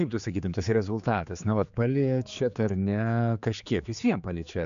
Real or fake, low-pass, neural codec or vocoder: fake; 7.2 kHz; codec, 16 kHz, 4 kbps, X-Codec, HuBERT features, trained on general audio